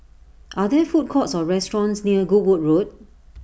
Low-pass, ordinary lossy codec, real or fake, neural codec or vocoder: none; none; real; none